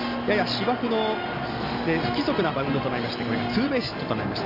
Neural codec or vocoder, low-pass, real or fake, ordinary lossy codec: none; 5.4 kHz; real; none